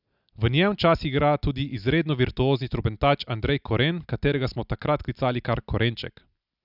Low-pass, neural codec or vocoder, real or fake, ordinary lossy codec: 5.4 kHz; none; real; none